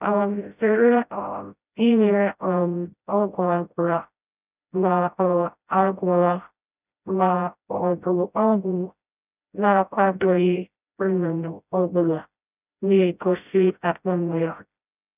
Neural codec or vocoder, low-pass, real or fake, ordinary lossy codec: codec, 16 kHz, 0.5 kbps, FreqCodec, smaller model; 3.6 kHz; fake; none